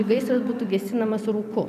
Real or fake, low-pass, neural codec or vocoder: fake; 14.4 kHz; vocoder, 44.1 kHz, 128 mel bands every 256 samples, BigVGAN v2